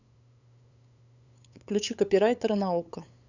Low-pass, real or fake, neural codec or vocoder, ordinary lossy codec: 7.2 kHz; fake; codec, 16 kHz, 8 kbps, FunCodec, trained on LibriTTS, 25 frames a second; none